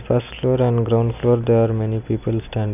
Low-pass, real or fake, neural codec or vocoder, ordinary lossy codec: 3.6 kHz; real; none; none